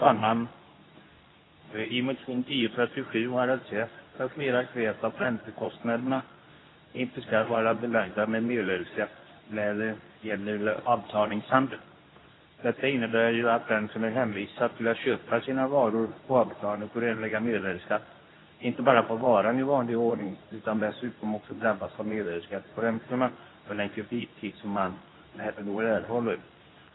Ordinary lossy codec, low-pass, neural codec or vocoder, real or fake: AAC, 16 kbps; 7.2 kHz; codec, 24 kHz, 0.9 kbps, WavTokenizer, medium speech release version 2; fake